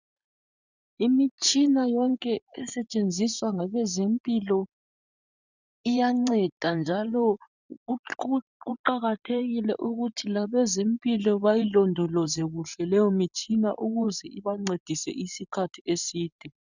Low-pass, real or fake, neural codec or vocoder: 7.2 kHz; fake; vocoder, 44.1 kHz, 128 mel bands every 512 samples, BigVGAN v2